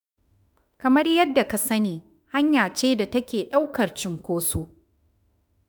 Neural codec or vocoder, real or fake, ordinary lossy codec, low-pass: autoencoder, 48 kHz, 32 numbers a frame, DAC-VAE, trained on Japanese speech; fake; none; none